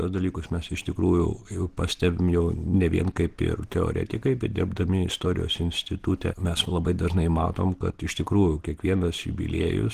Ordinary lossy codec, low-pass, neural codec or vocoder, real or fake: Opus, 16 kbps; 14.4 kHz; none; real